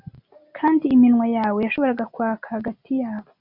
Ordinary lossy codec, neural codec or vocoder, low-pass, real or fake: Opus, 64 kbps; none; 5.4 kHz; real